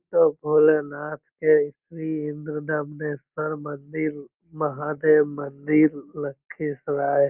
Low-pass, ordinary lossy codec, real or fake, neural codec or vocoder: 3.6 kHz; Opus, 32 kbps; real; none